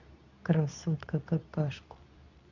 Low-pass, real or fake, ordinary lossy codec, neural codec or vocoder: 7.2 kHz; fake; none; codec, 24 kHz, 0.9 kbps, WavTokenizer, medium speech release version 2